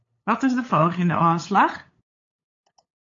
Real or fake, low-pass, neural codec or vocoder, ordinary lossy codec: fake; 7.2 kHz; codec, 16 kHz, 8 kbps, FunCodec, trained on LibriTTS, 25 frames a second; AAC, 32 kbps